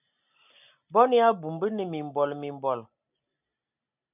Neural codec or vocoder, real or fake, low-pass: none; real; 3.6 kHz